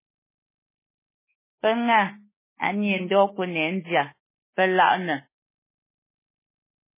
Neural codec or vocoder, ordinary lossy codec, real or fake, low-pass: autoencoder, 48 kHz, 32 numbers a frame, DAC-VAE, trained on Japanese speech; MP3, 16 kbps; fake; 3.6 kHz